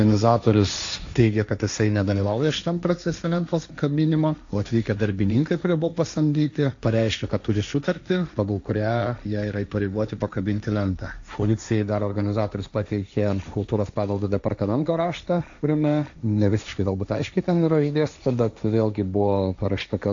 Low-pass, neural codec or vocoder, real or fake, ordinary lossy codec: 7.2 kHz; codec, 16 kHz, 1.1 kbps, Voila-Tokenizer; fake; AAC, 64 kbps